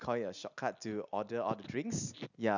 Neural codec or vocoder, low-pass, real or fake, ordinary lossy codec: none; 7.2 kHz; real; none